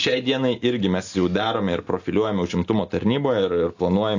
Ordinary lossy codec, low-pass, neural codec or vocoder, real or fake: AAC, 48 kbps; 7.2 kHz; none; real